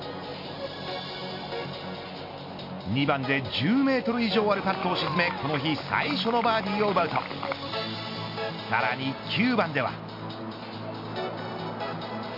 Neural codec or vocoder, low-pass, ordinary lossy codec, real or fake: none; 5.4 kHz; MP3, 32 kbps; real